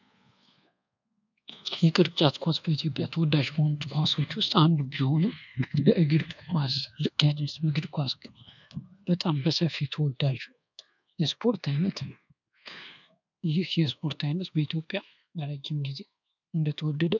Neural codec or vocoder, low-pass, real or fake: codec, 24 kHz, 1.2 kbps, DualCodec; 7.2 kHz; fake